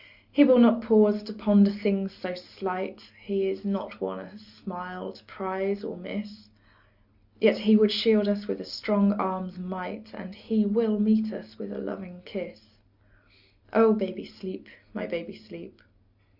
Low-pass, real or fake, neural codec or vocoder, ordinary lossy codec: 5.4 kHz; real; none; Opus, 64 kbps